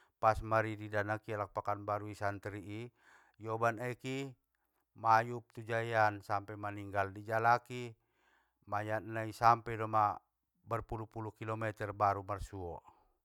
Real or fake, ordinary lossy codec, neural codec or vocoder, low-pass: real; none; none; 19.8 kHz